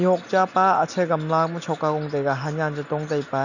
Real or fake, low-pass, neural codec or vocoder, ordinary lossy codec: real; 7.2 kHz; none; none